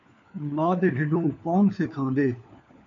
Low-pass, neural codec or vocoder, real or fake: 7.2 kHz; codec, 16 kHz, 4 kbps, FunCodec, trained on LibriTTS, 50 frames a second; fake